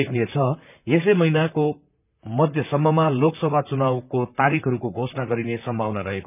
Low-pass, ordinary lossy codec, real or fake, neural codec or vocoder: 3.6 kHz; none; fake; vocoder, 44.1 kHz, 128 mel bands, Pupu-Vocoder